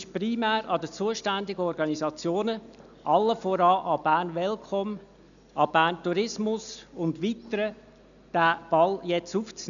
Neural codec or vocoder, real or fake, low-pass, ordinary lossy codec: none; real; 7.2 kHz; none